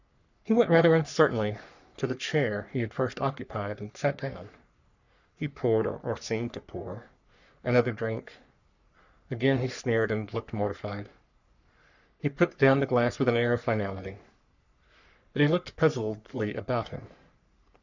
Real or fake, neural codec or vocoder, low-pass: fake; codec, 44.1 kHz, 3.4 kbps, Pupu-Codec; 7.2 kHz